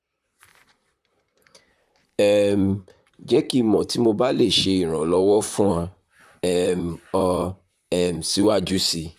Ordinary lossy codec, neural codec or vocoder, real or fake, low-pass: AAC, 96 kbps; vocoder, 44.1 kHz, 128 mel bands, Pupu-Vocoder; fake; 14.4 kHz